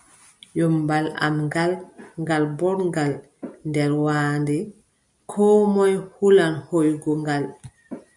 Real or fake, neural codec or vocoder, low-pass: real; none; 10.8 kHz